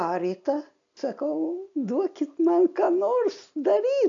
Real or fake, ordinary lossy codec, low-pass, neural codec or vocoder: real; AAC, 64 kbps; 7.2 kHz; none